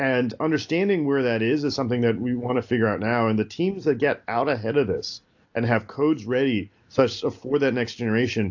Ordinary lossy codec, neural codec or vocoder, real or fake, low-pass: AAC, 48 kbps; none; real; 7.2 kHz